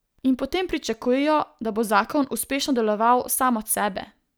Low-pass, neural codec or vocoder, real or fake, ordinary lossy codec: none; none; real; none